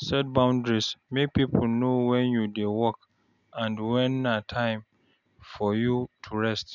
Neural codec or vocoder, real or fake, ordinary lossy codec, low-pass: none; real; none; 7.2 kHz